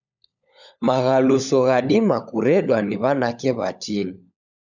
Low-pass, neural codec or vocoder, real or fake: 7.2 kHz; codec, 16 kHz, 16 kbps, FunCodec, trained on LibriTTS, 50 frames a second; fake